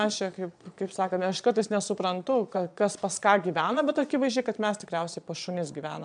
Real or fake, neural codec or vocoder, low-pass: fake; vocoder, 22.05 kHz, 80 mel bands, Vocos; 9.9 kHz